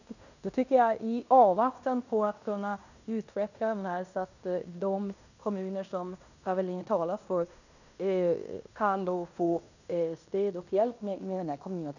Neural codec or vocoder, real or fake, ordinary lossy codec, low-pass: codec, 16 kHz in and 24 kHz out, 0.9 kbps, LongCat-Audio-Codec, fine tuned four codebook decoder; fake; none; 7.2 kHz